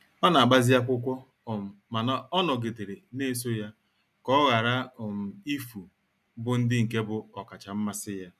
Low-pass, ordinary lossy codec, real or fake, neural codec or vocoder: 14.4 kHz; none; real; none